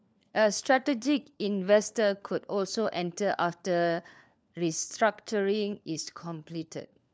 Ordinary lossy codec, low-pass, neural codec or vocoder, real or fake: none; none; codec, 16 kHz, 4 kbps, FunCodec, trained on LibriTTS, 50 frames a second; fake